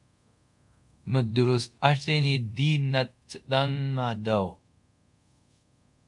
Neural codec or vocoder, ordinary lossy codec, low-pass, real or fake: codec, 24 kHz, 0.5 kbps, DualCodec; MP3, 96 kbps; 10.8 kHz; fake